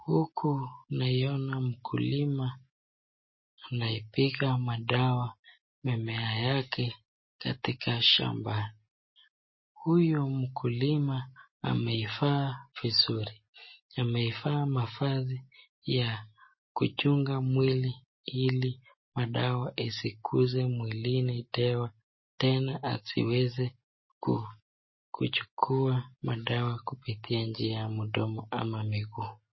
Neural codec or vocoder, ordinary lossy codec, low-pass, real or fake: none; MP3, 24 kbps; 7.2 kHz; real